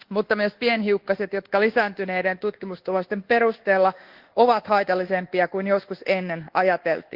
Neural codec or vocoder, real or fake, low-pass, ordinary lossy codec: codec, 24 kHz, 1.2 kbps, DualCodec; fake; 5.4 kHz; Opus, 16 kbps